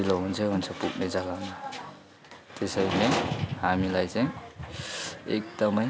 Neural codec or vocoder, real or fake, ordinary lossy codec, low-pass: none; real; none; none